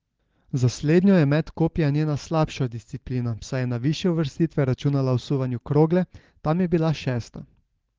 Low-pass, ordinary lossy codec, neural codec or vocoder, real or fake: 7.2 kHz; Opus, 16 kbps; none; real